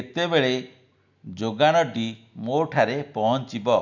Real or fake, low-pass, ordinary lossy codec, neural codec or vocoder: real; 7.2 kHz; none; none